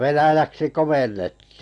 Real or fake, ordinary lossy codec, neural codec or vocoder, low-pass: real; none; none; 10.8 kHz